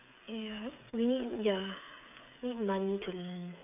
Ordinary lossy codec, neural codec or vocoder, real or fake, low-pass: none; codec, 16 kHz, 8 kbps, FreqCodec, smaller model; fake; 3.6 kHz